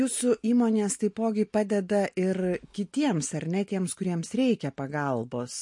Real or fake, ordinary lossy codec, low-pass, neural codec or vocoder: real; MP3, 48 kbps; 10.8 kHz; none